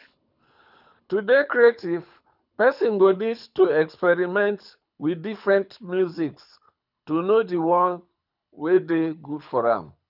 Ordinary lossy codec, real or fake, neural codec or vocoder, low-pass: none; fake; codec, 24 kHz, 6 kbps, HILCodec; 5.4 kHz